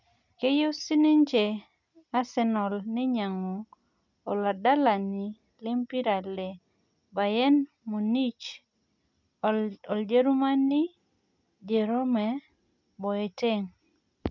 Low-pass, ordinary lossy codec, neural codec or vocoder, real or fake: 7.2 kHz; none; none; real